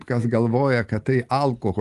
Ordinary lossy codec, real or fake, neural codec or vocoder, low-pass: Opus, 24 kbps; real; none; 10.8 kHz